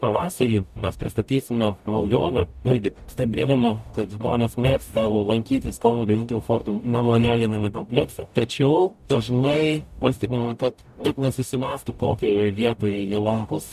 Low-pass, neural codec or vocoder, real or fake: 14.4 kHz; codec, 44.1 kHz, 0.9 kbps, DAC; fake